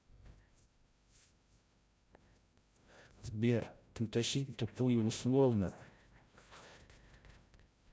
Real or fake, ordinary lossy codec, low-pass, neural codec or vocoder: fake; none; none; codec, 16 kHz, 0.5 kbps, FreqCodec, larger model